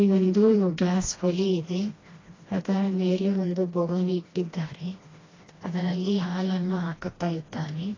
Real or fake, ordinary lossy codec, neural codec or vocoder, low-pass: fake; AAC, 32 kbps; codec, 16 kHz, 1 kbps, FreqCodec, smaller model; 7.2 kHz